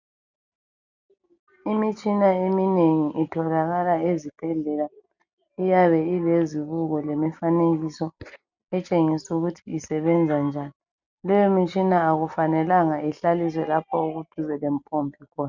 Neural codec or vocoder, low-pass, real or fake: none; 7.2 kHz; real